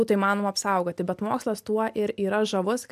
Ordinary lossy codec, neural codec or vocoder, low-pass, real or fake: MP3, 96 kbps; none; 14.4 kHz; real